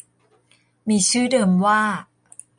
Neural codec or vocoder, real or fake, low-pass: none; real; 9.9 kHz